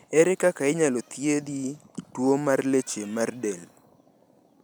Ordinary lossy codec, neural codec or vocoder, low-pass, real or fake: none; none; none; real